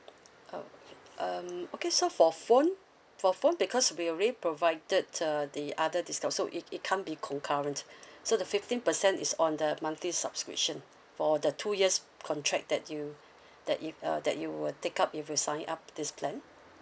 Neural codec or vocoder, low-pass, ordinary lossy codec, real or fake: none; none; none; real